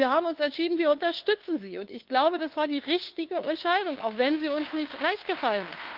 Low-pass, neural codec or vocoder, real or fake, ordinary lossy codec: 5.4 kHz; codec, 16 kHz, 2 kbps, FunCodec, trained on LibriTTS, 25 frames a second; fake; Opus, 32 kbps